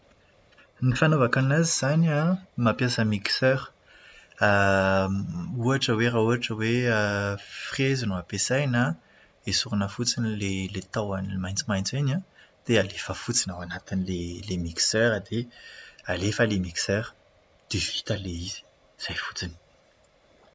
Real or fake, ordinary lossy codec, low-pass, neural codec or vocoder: real; none; none; none